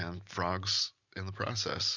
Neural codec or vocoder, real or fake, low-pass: none; real; 7.2 kHz